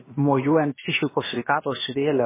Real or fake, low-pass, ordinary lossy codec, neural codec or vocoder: fake; 3.6 kHz; MP3, 16 kbps; codec, 16 kHz, about 1 kbps, DyCAST, with the encoder's durations